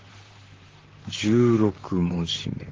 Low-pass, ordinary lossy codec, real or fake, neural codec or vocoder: 7.2 kHz; Opus, 16 kbps; fake; vocoder, 44.1 kHz, 128 mel bands, Pupu-Vocoder